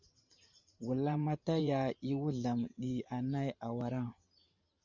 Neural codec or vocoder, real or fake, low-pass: vocoder, 44.1 kHz, 128 mel bands every 512 samples, BigVGAN v2; fake; 7.2 kHz